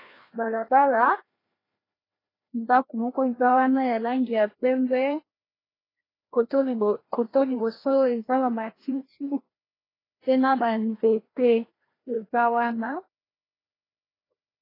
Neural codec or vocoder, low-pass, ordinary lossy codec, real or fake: codec, 16 kHz, 1 kbps, FreqCodec, larger model; 5.4 kHz; AAC, 24 kbps; fake